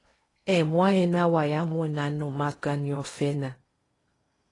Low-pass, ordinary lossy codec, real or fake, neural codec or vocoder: 10.8 kHz; AAC, 32 kbps; fake; codec, 16 kHz in and 24 kHz out, 0.8 kbps, FocalCodec, streaming, 65536 codes